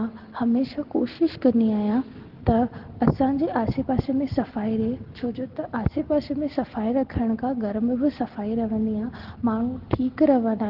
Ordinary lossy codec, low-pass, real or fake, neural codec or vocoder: Opus, 16 kbps; 5.4 kHz; real; none